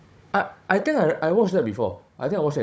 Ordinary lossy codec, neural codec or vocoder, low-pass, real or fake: none; codec, 16 kHz, 16 kbps, FunCodec, trained on Chinese and English, 50 frames a second; none; fake